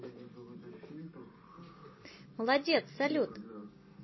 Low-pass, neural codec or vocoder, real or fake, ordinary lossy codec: 7.2 kHz; none; real; MP3, 24 kbps